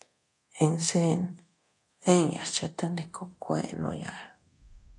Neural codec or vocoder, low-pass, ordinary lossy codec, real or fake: codec, 24 kHz, 0.9 kbps, DualCodec; 10.8 kHz; AAC, 48 kbps; fake